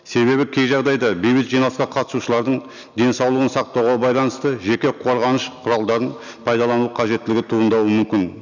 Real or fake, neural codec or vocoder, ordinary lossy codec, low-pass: real; none; none; 7.2 kHz